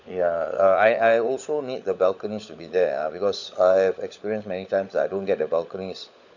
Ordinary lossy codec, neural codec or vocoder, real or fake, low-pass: none; codec, 16 kHz, 16 kbps, FunCodec, trained on LibriTTS, 50 frames a second; fake; 7.2 kHz